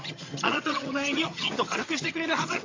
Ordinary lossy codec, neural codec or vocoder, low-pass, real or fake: AAC, 32 kbps; vocoder, 22.05 kHz, 80 mel bands, HiFi-GAN; 7.2 kHz; fake